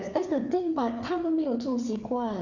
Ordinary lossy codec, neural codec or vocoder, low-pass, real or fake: none; codec, 16 kHz, 4 kbps, FreqCodec, larger model; 7.2 kHz; fake